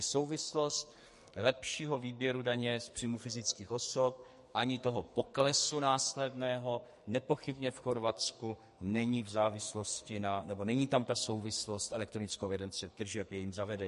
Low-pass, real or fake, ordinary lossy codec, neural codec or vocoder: 14.4 kHz; fake; MP3, 48 kbps; codec, 44.1 kHz, 2.6 kbps, SNAC